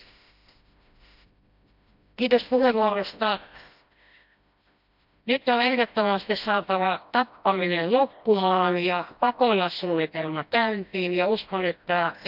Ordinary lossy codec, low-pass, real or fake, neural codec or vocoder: none; 5.4 kHz; fake; codec, 16 kHz, 1 kbps, FreqCodec, smaller model